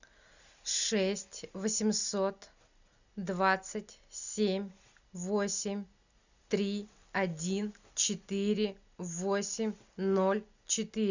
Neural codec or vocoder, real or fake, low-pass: none; real; 7.2 kHz